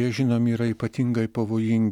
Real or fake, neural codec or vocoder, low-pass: real; none; 19.8 kHz